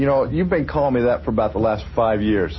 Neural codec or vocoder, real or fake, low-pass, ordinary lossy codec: none; real; 7.2 kHz; MP3, 24 kbps